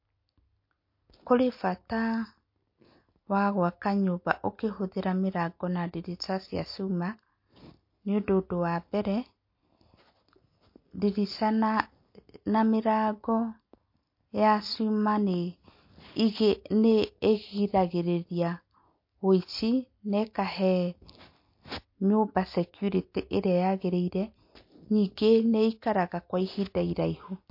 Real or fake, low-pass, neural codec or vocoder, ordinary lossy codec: real; 5.4 kHz; none; MP3, 32 kbps